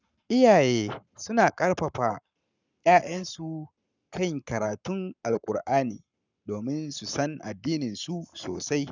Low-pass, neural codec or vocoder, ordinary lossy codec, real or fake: 7.2 kHz; codec, 44.1 kHz, 7.8 kbps, Pupu-Codec; none; fake